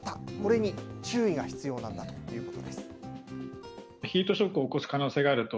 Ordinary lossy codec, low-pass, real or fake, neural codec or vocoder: none; none; real; none